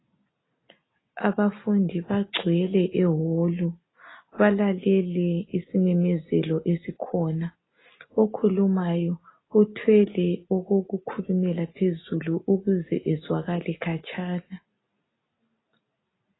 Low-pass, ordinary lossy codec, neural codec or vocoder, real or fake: 7.2 kHz; AAC, 16 kbps; none; real